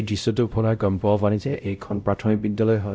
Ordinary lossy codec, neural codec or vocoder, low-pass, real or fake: none; codec, 16 kHz, 0.5 kbps, X-Codec, WavLM features, trained on Multilingual LibriSpeech; none; fake